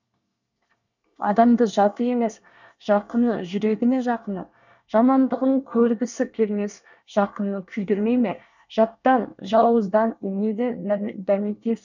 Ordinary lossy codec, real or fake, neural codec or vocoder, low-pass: none; fake; codec, 24 kHz, 1 kbps, SNAC; 7.2 kHz